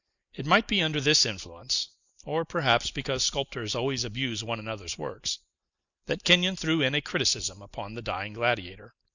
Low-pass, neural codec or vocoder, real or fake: 7.2 kHz; none; real